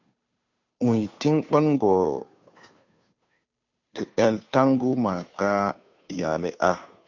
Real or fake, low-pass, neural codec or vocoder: fake; 7.2 kHz; codec, 16 kHz, 2 kbps, FunCodec, trained on Chinese and English, 25 frames a second